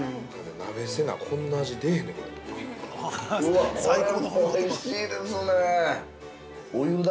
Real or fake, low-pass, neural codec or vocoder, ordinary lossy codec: real; none; none; none